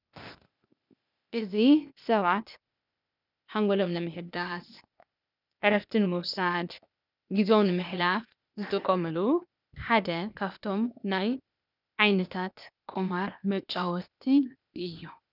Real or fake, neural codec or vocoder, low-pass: fake; codec, 16 kHz, 0.8 kbps, ZipCodec; 5.4 kHz